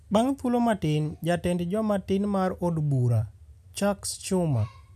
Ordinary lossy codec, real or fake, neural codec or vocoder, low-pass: none; real; none; 14.4 kHz